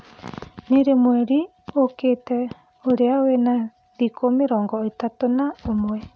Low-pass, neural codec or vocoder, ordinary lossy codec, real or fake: none; none; none; real